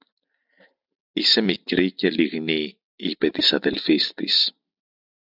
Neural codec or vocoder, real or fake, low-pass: none; real; 5.4 kHz